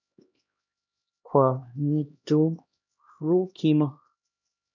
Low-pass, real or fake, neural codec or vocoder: 7.2 kHz; fake; codec, 16 kHz, 1 kbps, X-Codec, HuBERT features, trained on LibriSpeech